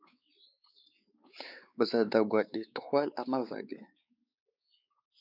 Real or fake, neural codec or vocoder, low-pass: fake; codec, 16 kHz, 4 kbps, X-Codec, WavLM features, trained on Multilingual LibriSpeech; 5.4 kHz